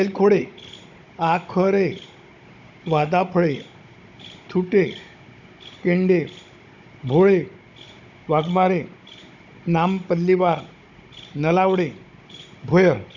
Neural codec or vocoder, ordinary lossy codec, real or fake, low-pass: codec, 16 kHz, 16 kbps, FunCodec, trained on Chinese and English, 50 frames a second; none; fake; 7.2 kHz